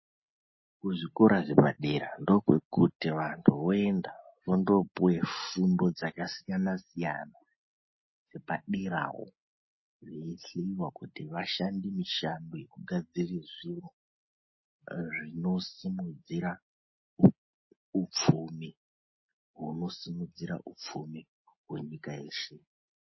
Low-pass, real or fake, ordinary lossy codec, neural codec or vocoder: 7.2 kHz; real; MP3, 24 kbps; none